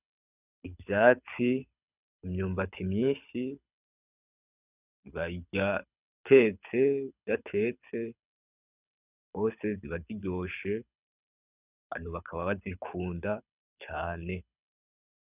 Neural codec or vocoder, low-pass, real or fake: codec, 24 kHz, 6 kbps, HILCodec; 3.6 kHz; fake